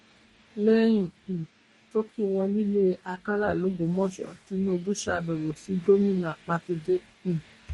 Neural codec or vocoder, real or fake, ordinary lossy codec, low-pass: codec, 44.1 kHz, 2.6 kbps, DAC; fake; MP3, 48 kbps; 19.8 kHz